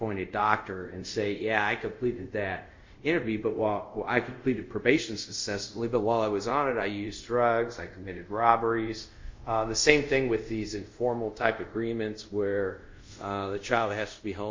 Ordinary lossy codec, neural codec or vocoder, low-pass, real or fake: MP3, 48 kbps; codec, 24 kHz, 0.5 kbps, DualCodec; 7.2 kHz; fake